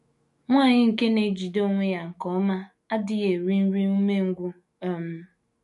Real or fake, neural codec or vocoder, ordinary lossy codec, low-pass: fake; autoencoder, 48 kHz, 128 numbers a frame, DAC-VAE, trained on Japanese speech; MP3, 48 kbps; 14.4 kHz